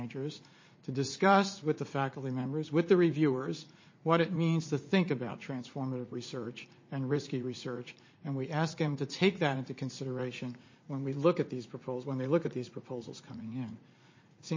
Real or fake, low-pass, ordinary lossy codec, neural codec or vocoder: fake; 7.2 kHz; MP3, 32 kbps; vocoder, 44.1 kHz, 80 mel bands, Vocos